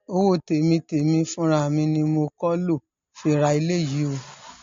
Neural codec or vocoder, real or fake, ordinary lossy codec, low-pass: none; real; AAC, 48 kbps; 7.2 kHz